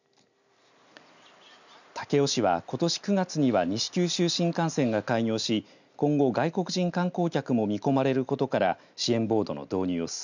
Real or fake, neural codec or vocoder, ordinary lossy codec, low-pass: real; none; none; 7.2 kHz